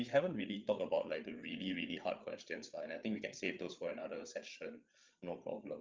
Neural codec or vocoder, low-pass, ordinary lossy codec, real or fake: codec, 16 kHz, 8 kbps, FreqCodec, larger model; 7.2 kHz; Opus, 32 kbps; fake